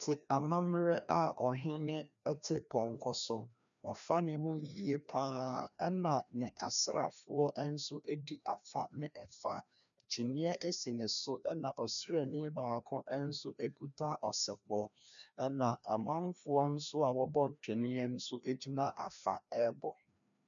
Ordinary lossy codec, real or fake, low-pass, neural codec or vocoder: MP3, 96 kbps; fake; 7.2 kHz; codec, 16 kHz, 1 kbps, FreqCodec, larger model